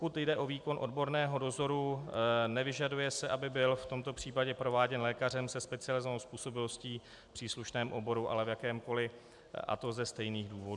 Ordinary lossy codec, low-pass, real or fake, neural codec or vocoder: MP3, 96 kbps; 10.8 kHz; real; none